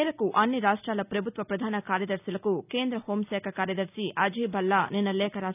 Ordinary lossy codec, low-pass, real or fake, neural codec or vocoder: none; 3.6 kHz; real; none